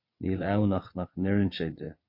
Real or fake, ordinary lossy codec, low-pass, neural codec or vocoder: fake; MP3, 32 kbps; 5.4 kHz; vocoder, 22.05 kHz, 80 mel bands, WaveNeXt